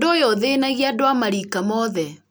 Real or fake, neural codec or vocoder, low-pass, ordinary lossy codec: real; none; none; none